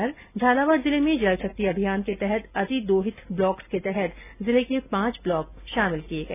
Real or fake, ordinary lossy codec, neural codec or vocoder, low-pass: real; none; none; 3.6 kHz